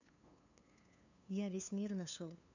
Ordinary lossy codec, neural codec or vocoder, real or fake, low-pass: none; codec, 16 kHz, 2 kbps, FunCodec, trained on LibriTTS, 25 frames a second; fake; 7.2 kHz